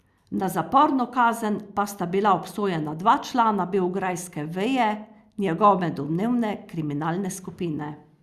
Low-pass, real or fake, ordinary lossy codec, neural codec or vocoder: 14.4 kHz; real; Opus, 64 kbps; none